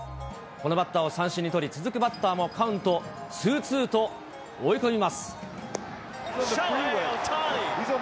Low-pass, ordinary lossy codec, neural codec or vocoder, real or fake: none; none; none; real